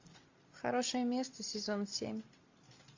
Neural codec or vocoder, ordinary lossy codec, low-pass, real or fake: none; AAC, 48 kbps; 7.2 kHz; real